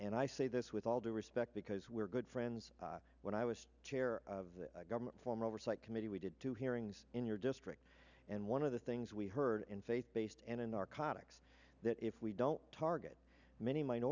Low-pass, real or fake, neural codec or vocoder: 7.2 kHz; real; none